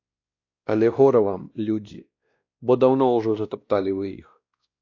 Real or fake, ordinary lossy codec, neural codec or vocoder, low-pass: fake; AAC, 48 kbps; codec, 16 kHz, 1 kbps, X-Codec, WavLM features, trained on Multilingual LibriSpeech; 7.2 kHz